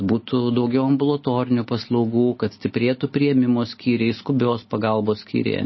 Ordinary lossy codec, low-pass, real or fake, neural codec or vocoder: MP3, 24 kbps; 7.2 kHz; real; none